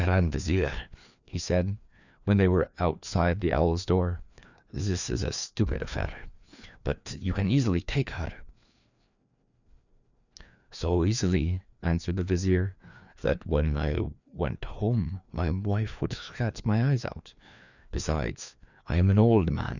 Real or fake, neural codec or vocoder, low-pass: fake; codec, 16 kHz, 2 kbps, FreqCodec, larger model; 7.2 kHz